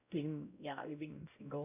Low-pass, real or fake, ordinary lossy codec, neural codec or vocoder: 3.6 kHz; fake; none; codec, 16 kHz, 0.5 kbps, X-Codec, WavLM features, trained on Multilingual LibriSpeech